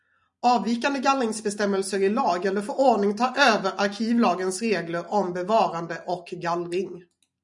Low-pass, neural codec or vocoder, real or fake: 10.8 kHz; none; real